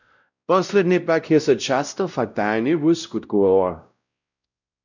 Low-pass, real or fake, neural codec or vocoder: 7.2 kHz; fake; codec, 16 kHz, 0.5 kbps, X-Codec, WavLM features, trained on Multilingual LibriSpeech